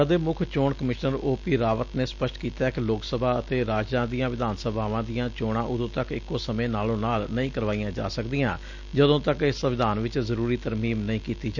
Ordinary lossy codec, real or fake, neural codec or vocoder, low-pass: Opus, 64 kbps; real; none; 7.2 kHz